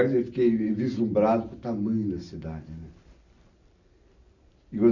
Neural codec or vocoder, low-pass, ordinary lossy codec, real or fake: none; 7.2 kHz; none; real